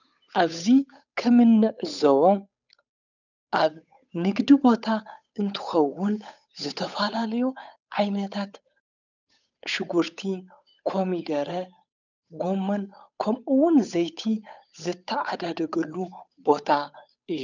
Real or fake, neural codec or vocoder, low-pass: fake; codec, 16 kHz, 8 kbps, FunCodec, trained on Chinese and English, 25 frames a second; 7.2 kHz